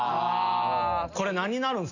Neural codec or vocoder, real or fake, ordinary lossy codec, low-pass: none; real; none; 7.2 kHz